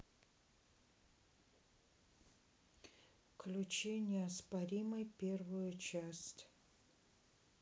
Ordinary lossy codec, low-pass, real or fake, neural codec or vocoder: none; none; real; none